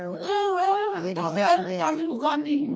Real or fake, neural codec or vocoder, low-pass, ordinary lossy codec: fake; codec, 16 kHz, 1 kbps, FreqCodec, larger model; none; none